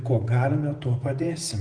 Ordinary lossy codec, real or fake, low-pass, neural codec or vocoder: Opus, 24 kbps; fake; 9.9 kHz; vocoder, 48 kHz, 128 mel bands, Vocos